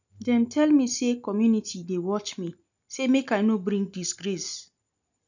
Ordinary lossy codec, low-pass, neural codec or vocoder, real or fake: none; 7.2 kHz; none; real